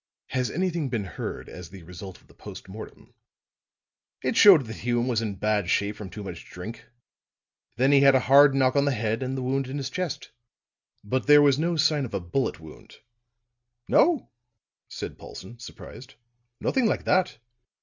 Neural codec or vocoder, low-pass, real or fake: none; 7.2 kHz; real